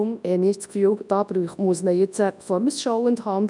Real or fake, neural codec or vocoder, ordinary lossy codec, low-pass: fake; codec, 24 kHz, 0.9 kbps, WavTokenizer, large speech release; none; 10.8 kHz